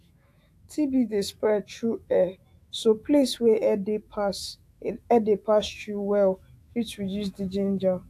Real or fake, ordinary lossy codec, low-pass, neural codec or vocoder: fake; AAC, 64 kbps; 14.4 kHz; autoencoder, 48 kHz, 128 numbers a frame, DAC-VAE, trained on Japanese speech